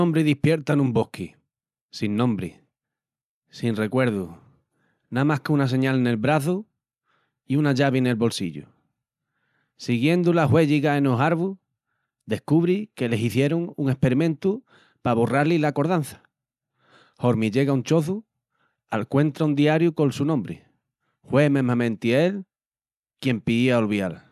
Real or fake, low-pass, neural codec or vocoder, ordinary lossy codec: real; 14.4 kHz; none; none